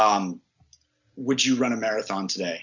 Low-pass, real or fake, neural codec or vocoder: 7.2 kHz; real; none